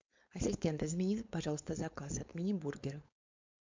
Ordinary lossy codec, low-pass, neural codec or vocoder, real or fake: MP3, 64 kbps; 7.2 kHz; codec, 16 kHz, 4.8 kbps, FACodec; fake